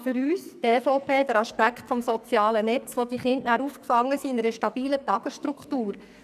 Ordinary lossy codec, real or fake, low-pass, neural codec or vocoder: none; fake; 14.4 kHz; codec, 44.1 kHz, 2.6 kbps, SNAC